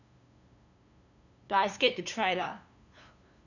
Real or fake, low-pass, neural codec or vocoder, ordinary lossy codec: fake; 7.2 kHz; codec, 16 kHz, 2 kbps, FunCodec, trained on LibriTTS, 25 frames a second; none